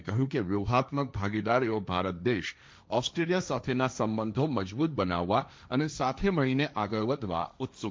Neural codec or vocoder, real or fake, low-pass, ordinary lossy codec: codec, 16 kHz, 1.1 kbps, Voila-Tokenizer; fake; none; none